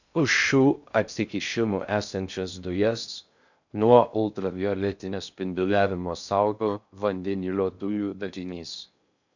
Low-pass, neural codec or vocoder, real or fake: 7.2 kHz; codec, 16 kHz in and 24 kHz out, 0.6 kbps, FocalCodec, streaming, 2048 codes; fake